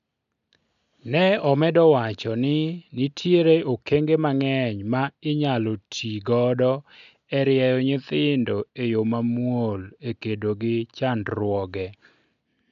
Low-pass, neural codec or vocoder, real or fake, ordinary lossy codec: 7.2 kHz; none; real; none